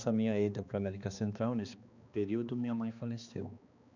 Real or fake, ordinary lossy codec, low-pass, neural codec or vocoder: fake; none; 7.2 kHz; codec, 16 kHz, 2 kbps, X-Codec, HuBERT features, trained on balanced general audio